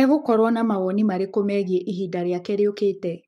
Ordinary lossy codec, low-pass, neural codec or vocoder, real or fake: MP3, 64 kbps; 19.8 kHz; codec, 44.1 kHz, 7.8 kbps, Pupu-Codec; fake